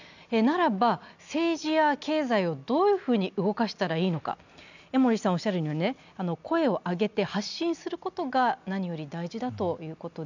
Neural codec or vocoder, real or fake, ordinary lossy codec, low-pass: none; real; none; 7.2 kHz